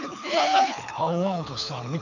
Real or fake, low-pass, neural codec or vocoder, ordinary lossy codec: fake; 7.2 kHz; codec, 24 kHz, 6 kbps, HILCodec; none